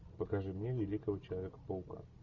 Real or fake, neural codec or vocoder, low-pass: real; none; 7.2 kHz